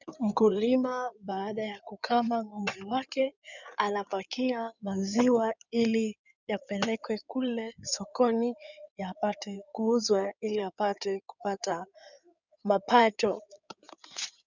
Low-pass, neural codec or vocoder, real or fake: 7.2 kHz; codec, 16 kHz in and 24 kHz out, 2.2 kbps, FireRedTTS-2 codec; fake